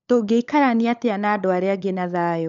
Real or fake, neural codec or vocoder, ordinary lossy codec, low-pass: fake; codec, 16 kHz, 16 kbps, FunCodec, trained on LibriTTS, 50 frames a second; MP3, 64 kbps; 7.2 kHz